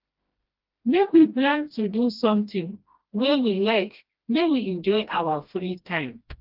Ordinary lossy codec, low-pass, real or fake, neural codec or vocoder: Opus, 32 kbps; 5.4 kHz; fake; codec, 16 kHz, 1 kbps, FreqCodec, smaller model